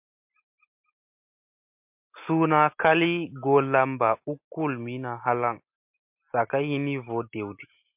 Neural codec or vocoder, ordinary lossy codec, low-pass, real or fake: none; MP3, 32 kbps; 3.6 kHz; real